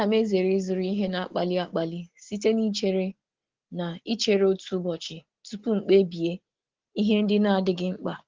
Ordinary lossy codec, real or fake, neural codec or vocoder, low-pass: Opus, 16 kbps; real; none; 7.2 kHz